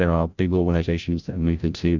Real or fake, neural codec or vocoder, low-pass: fake; codec, 16 kHz, 0.5 kbps, FreqCodec, larger model; 7.2 kHz